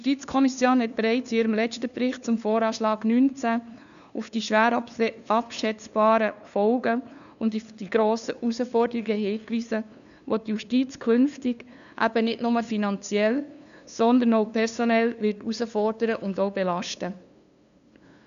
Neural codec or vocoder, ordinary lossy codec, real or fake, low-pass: codec, 16 kHz, 2 kbps, FunCodec, trained on LibriTTS, 25 frames a second; none; fake; 7.2 kHz